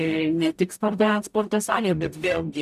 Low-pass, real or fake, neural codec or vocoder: 14.4 kHz; fake; codec, 44.1 kHz, 0.9 kbps, DAC